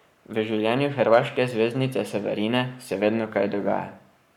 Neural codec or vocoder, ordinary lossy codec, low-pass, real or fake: codec, 44.1 kHz, 7.8 kbps, Pupu-Codec; none; 19.8 kHz; fake